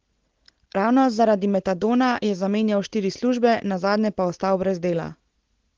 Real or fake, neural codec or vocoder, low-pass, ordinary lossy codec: real; none; 7.2 kHz; Opus, 16 kbps